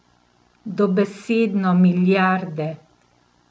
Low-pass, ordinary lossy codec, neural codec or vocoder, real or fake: none; none; none; real